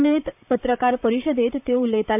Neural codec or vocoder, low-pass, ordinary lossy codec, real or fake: vocoder, 44.1 kHz, 128 mel bands, Pupu-Vocoder; 3.6 kHz; none; fake